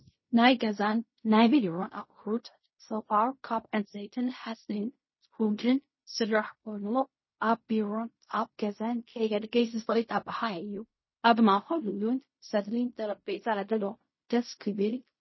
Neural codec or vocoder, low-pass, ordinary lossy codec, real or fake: codec, 16 kHz in and 24 kHz out, 0.4 kbps, LongCat-Audio-Codec, fine tuned four codebook decoder; 7.2 kHz; MP3, 24 kbps; fake